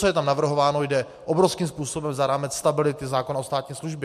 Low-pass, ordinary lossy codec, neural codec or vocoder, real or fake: 14.4 kHz; MP3, 64 kbps; none; real